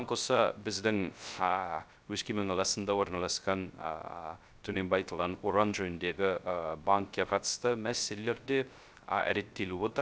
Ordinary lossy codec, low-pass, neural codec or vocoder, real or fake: none; none; codec, 16 kHz, 0.3 kbps, FocalCodec; fake